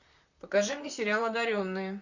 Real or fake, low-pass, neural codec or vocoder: fake; 7.2 kHz; vocoder, 44.1 kHz, 128 mel bands, Pupu-Vocoder